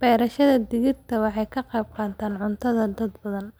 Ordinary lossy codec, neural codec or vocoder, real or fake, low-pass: none; none; real; none